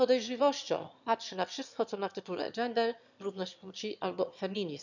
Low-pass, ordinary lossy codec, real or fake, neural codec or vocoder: 7.2 kHz; none; fake; autoencoder, 22.05 kHz, a latent of 192 numbers a frame, VITS, trained on one speaker